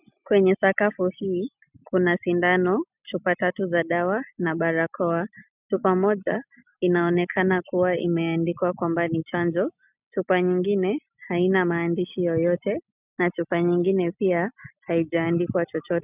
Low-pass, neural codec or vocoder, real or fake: 3.6 kHz; none; real